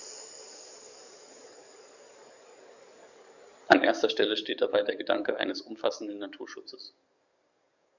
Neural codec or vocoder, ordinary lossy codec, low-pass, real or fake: codec, 44.1 kHz, 7.8 kbps, DAC; none; 7.2 kHz; fake